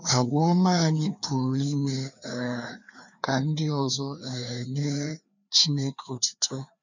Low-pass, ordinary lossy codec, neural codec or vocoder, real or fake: 7.2 kHz; none; codec, 16 kHz, 2 kbps, FreqCodec, larger model; fake